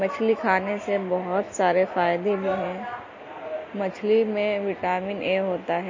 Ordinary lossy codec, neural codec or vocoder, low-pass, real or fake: MP3, 32 kbps; none; 7.2 kHz; real